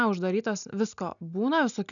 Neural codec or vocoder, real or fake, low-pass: none; real; 7.2 kHz